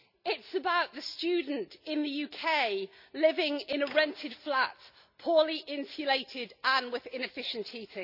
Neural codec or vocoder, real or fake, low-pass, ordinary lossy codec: none; real; 5.4 kHz; none